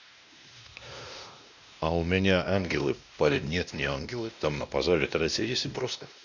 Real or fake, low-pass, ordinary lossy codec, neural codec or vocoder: fake; 7.2 kHz; none; codec, 16 kHz, 1 kbps, X-Codec, WavLM features, trained on Multilingual LibriSpeech